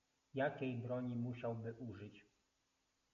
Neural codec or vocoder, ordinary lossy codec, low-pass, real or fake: none; MP3, 48 kbps; 7.2 kHz; real